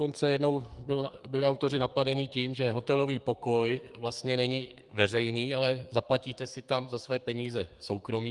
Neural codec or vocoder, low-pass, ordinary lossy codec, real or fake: codec, 44.1 kHz, 2.6 kbps, SNAC; 10.8 kHz; Opus, 32 kbps; fake